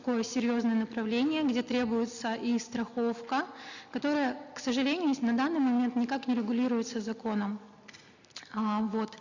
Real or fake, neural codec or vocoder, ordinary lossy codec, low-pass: real; none; none; 7.2 kHz